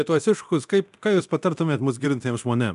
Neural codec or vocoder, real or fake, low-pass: codec, 24 kHz, 0.9 kbps, DualCodec; fake; 10.8 kHz